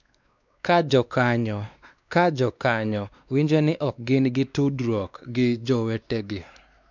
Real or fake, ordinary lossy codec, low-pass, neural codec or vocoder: fake; none; 7.2 kHz; codec, 16 kHz, 2 kbps, X-Codec, WavLM features, trained on Multilingual LibriSpeech